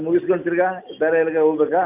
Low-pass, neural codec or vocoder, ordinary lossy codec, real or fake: 3.6 kHz; none; none; real